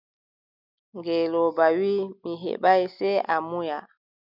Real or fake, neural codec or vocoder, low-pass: real; none; 5.4 kHz